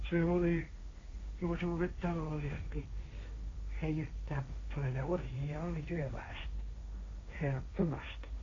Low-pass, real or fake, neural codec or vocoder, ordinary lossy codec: 7.2 kHz; fake; codec, 16 kHz, 1.1 kbps, Voila-Tokenizer; AAC, 32 kbps